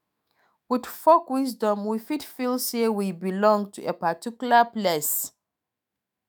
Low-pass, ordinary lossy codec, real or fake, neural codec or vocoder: none; none; fake; autoencoder, 48 kHz, 128 numbers a frame, DAC-VAE, trained on Japanese speech